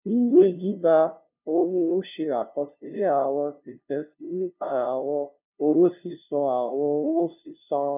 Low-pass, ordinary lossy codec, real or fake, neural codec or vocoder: 3.6 kHz; none; fake; codec, 16 kHz, 0.5 kbps, FunCodec, trained on LibriTTS, 25 frames a second